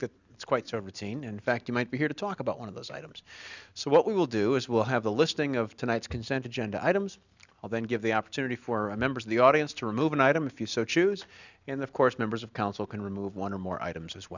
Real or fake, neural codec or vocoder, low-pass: real; none; 7.2 kHz